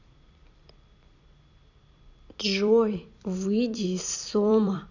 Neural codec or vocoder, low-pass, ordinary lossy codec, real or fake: vocoder, 44.1 kHz, 128 mel bands every 256 samples, BigVGAN v2; 7.2 kHz; none; fake